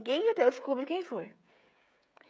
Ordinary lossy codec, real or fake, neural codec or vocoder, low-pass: none; fake; codec, 16 kHz, 16 kbps, FreqCodec, smaller model; none